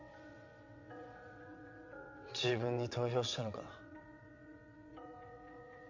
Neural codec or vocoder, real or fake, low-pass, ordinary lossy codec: vocoder, 22.05 kHz, 80 mel bands, WaveNeXt; fake; 7.2 kHz; MP3, 64 kbps